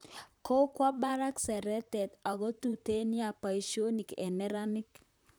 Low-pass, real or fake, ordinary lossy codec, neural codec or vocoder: none; fake; none; vocoder, 44.1 kHz, 128 mel bands, Pupu-Vocoder